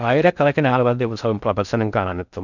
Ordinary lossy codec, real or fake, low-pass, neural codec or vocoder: none; fake; 7.2 kHz; codec, 16 kHz in and 24 kHz out, 0.6 kbps, FocalCodec, streaming, 4096 codes